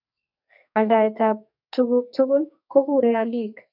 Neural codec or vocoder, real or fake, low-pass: codec, 44.1 kHz, 2.6 kbps, SNAC; fake; 5.4 kHz